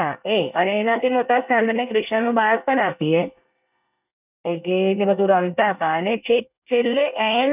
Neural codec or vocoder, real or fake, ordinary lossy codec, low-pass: codec, 24 kHz, 1 kbps, SNAC; fake; none; 3.6 kHz